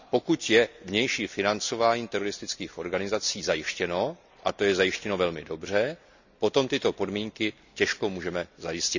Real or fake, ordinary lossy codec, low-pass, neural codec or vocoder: real; none; 7.2 kHz; none